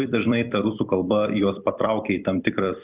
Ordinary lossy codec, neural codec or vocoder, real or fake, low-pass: Opus, 64 kbps; vocoder, 44.1 kHz, 128 mel bands every 256 samples, BigVGAN v2; fake; 3.6 kHz